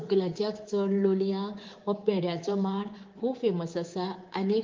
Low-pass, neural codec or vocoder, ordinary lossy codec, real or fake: 7.2 kHz; codec, 24 kHz, 3.1 kbps, DualCodec; Opus, 32 kbps; fake